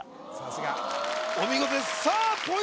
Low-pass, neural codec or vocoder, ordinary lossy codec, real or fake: none; none; none; real